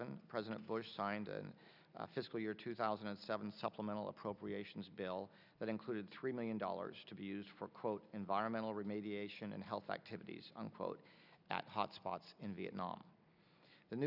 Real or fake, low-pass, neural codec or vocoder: real; 5.4 kHz; none